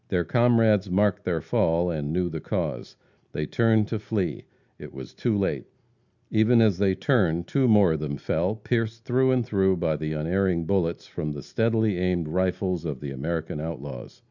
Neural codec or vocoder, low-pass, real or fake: none; 7.2 kHz; real